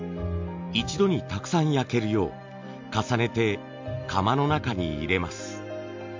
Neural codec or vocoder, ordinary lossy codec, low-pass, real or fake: none; MP3, 48 kbps; 7.2 kHz; real